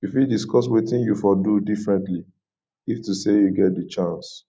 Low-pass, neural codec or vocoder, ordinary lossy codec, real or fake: none; none; none; real